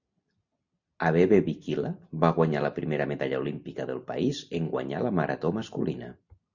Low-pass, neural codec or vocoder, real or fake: 7.2 kHz; none; real